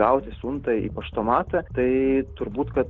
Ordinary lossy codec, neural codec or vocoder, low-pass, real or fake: Opus, 16 kbps; none; 7.2 kHz; real